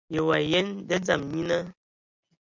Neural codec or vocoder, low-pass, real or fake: none; 7.2 kHz; real